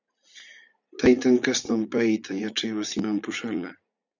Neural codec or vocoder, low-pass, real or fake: vocoder, 44.1 kHz, 80 mel bands, Vocos; 7.2 kHz; fake